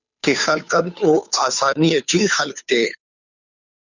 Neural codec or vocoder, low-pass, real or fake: codec, 16 kHz, 2 kbps, FunCodec, trained on Chinese and English, 25 frames a second; 7.2 kHz; fake